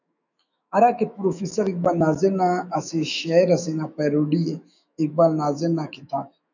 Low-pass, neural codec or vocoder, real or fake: 7.2 kHz; autoencoder, 48 kHz, 128 numbers a frame, DAC-VAE, trained on Japanese speech; fake